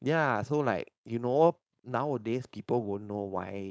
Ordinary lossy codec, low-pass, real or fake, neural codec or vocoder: none; none; fake; codec, 16 kHz, 4.8 kbps, FACodec